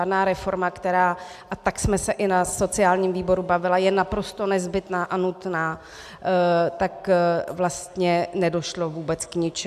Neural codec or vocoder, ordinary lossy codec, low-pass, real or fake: none; AAC, 96 kbps; 14.4 kHz; real